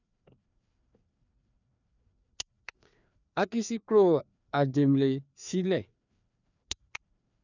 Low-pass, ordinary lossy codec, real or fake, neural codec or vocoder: 7.2 kHz; none; fake; codec, 16 kHz, 2 kbps, FreqCodec, larger model